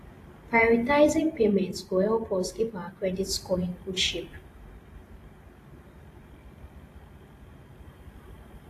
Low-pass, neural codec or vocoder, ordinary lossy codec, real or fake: 14.4 kHz; vocoder, 44.1 kHz, 128 mel bands every 512 samples, BigVGAN v2; AAC, 48 kbps; fake